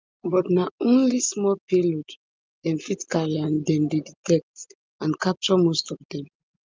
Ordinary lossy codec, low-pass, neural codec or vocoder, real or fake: Opus, 24 kbps; 7.2 kHz; none; real